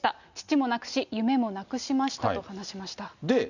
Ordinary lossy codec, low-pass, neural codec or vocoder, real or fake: none; 7.2 kHz; none; real